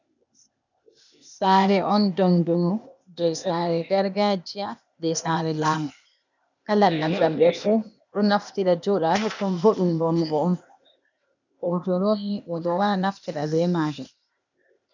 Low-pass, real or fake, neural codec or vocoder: 7.2 kHz; fake; codec, 16 kHz, 0.8 kbps, ZipCodec